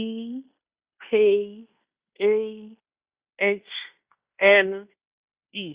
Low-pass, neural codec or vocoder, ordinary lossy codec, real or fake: 3.6 kHz; codec, 16 kHz in and 24 kHz out, 0.9 kbps, LongCat-Audio-Codec, fine tuned four codebook decoder; Opus, 64 kbps; fake